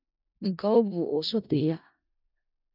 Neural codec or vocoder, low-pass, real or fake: codec, 16 kHz in and 24 kHz out, 0.4 kbps, LongCat-Audio-Codec, four codebook decoder; 5.4 kHz; fake